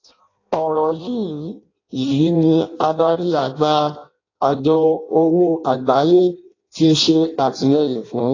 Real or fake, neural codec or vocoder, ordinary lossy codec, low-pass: fake; codec, 16 kHz in and 24 kHz out, 0.6 kbps, FireRedTTS-2 codec; AAC, 32 kbps; 7.2 kHz